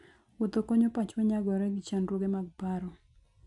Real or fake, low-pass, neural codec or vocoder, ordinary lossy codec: real; 10.8 kHz; none; none